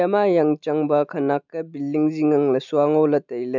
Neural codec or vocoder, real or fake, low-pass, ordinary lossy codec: none; real; 7.2 kHz; none